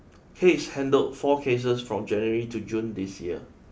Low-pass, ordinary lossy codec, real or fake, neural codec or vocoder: none; none; real; none